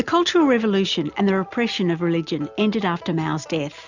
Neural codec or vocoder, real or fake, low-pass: none; real; 7.2 kHz